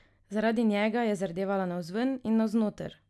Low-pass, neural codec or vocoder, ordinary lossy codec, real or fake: none; none; none; real